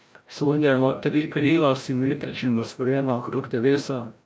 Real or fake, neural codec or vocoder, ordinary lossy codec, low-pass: fake; codec, 16 kHz, 0.5 kbps, FreqCodec, larger model; none; none